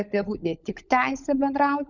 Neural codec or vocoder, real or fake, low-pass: codec, 16 kHz, 16 kbps, FunCodec, trained on LibriTTS, 50 frames a second; fake; 7.2 kHz